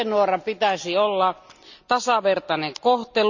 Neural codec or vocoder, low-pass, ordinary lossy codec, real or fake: none; 7.2 kHz; none; real